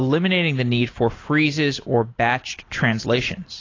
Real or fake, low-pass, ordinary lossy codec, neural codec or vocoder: real; 7.2 kHz; AAC, 32 kbps; none